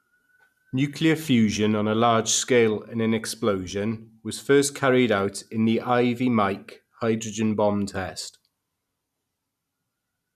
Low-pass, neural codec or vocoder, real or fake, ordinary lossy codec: 14.4 kHz; none; real; none